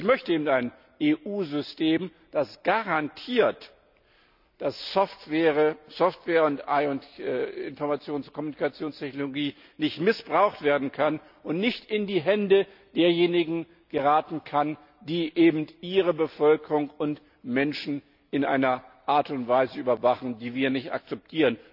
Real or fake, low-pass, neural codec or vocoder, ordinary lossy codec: real; 5.4 kHz; none; none